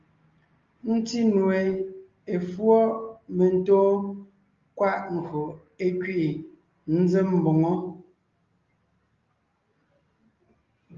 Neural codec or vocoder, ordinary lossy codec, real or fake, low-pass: none; Opus, 32 kbps; real; 7.2 kHz